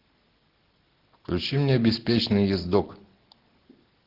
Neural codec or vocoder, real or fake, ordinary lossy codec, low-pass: none; real; Opus, 16 kbps; 5.4 kHz